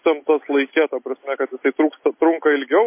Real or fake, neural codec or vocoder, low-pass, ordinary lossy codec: real; none; 3.6 kHz; MP3, 24 kbps